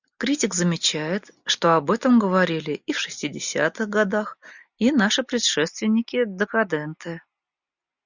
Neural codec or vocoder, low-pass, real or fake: none; 7.2 kHz; real